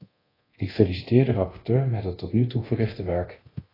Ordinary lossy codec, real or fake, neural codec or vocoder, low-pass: AAC, 24 kbps; fake; codec, 24 kHz, 0.9 kbps, DualCodec; 5.4 kHz